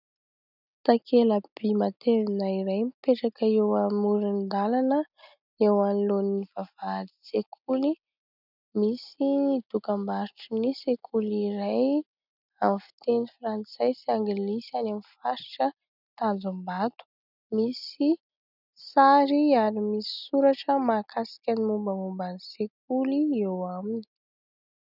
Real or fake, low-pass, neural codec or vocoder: real; 5.4 kHz; none